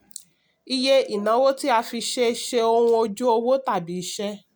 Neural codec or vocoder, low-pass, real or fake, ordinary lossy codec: none; none; real; none